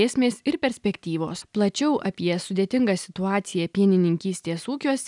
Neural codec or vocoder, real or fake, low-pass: none; real; 10.8 kHz